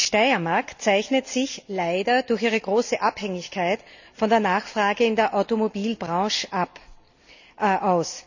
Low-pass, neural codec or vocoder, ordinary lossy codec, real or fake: 7.2 kHz; none; none; real